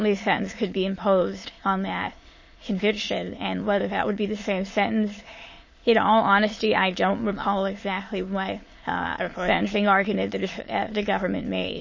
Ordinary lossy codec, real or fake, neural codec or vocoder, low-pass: MP3, 32 kbps; fake; autoencoder, 22.05 kHz, a latent of 192 numbers a frame, VITS, trained on many speakers; 7.2 kHz